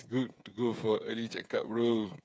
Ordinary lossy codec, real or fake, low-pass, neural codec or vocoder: none; fake; none; codec, 16 kHz, 8 kbps, FreqCodec, smaller model